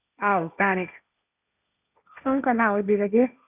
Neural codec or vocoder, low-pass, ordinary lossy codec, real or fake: codec, 16 kHz, 1.1 kbps, Voila-Tokenizer; 3.6 kHz; none; fake